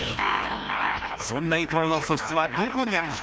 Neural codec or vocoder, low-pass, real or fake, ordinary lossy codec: codec, 16 kHz, 1 kbps, FreqCodec, larger model; none; fake; none